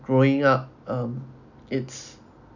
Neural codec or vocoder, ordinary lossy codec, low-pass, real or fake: none; none; 7.2 kHz; real